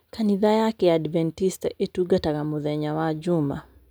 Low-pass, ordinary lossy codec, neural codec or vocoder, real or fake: none; none; none; real